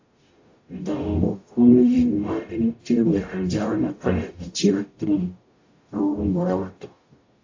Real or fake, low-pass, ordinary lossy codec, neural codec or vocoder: fake; 7.2 kHz; AAC, 48 kbps; codec, 44.1 kHz, 0.9 kbps, DAC